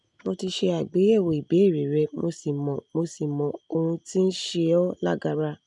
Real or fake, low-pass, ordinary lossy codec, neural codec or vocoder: real; 10.8 kHz; none; none